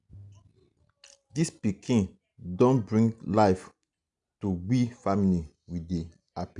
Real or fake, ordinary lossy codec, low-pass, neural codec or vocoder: real; none; 10.8 kHz; none